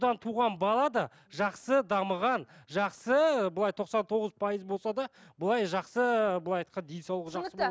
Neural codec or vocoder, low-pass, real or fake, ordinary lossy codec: none; none; real; none